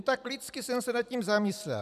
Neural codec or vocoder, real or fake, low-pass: none; real; 14.4 kHz